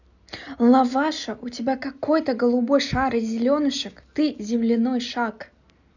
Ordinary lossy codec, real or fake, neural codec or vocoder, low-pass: none; real; none; 7.2 kHz